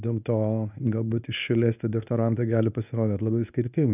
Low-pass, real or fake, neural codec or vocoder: 3.6 kHz; fake; codec, 24 kHz, 0.9 kbps, WavTokenizer, medium speech release version 1